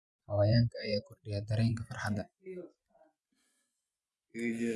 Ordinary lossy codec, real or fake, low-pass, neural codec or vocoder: none; fake; none; vocoder, 24 kHz, 100 mel bands, Vocos